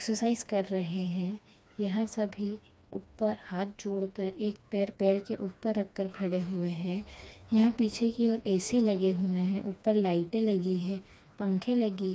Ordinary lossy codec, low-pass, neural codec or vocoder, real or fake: none; none; codec, 16 kHz, 2 kbps, FreqCodec, smaller model; fake